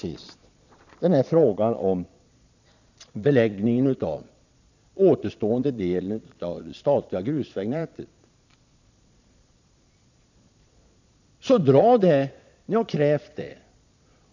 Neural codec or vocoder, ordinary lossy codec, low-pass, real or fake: vocoder, 22.05 kHz, 80 mel bands, Vocos; none; 7.2 kHz; fake